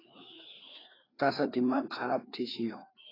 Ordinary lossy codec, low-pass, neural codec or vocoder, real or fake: AAC, 24 kbps; 5.4 kHz; codec, 16 kHz, 2 kbps, FreqCodec, larger model; fake